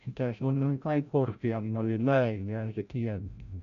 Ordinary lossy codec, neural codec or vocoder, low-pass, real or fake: none; codec, 16 kHz, 0.5 kbps, FreqCodec, larger model; 7.2 kHz; fake